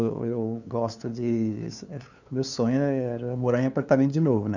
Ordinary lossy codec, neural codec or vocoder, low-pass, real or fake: none; codec, 16 kHz, 2 kbps, FunCodec, trained on LibriTTS, 25 frames a second; 7.2 kHz; fake